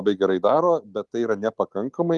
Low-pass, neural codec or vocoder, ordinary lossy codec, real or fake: 10.8 kHz; none; Opus, 24 kbps; real